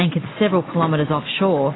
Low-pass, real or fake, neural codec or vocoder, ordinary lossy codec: 7.2 kHz; real; none; AAC, 16 kbps